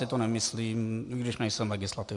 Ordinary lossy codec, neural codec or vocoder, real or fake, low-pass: MP3, 64 kbps; none; real; 10.8 kHz